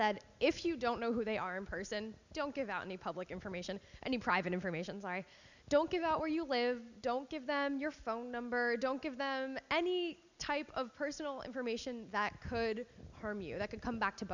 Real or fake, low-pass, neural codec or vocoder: real; 7.2 kHz; none